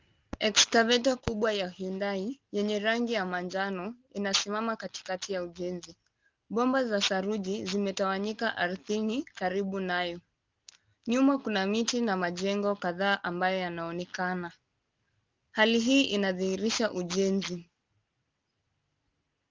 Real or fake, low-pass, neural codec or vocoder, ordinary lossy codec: real; 7.2 kHz; none; Opus, 24 kbps